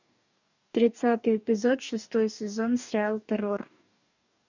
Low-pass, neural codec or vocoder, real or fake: 7.2 kHz; codec, 44.1 kHz, 2.6 kbps, DAC; fake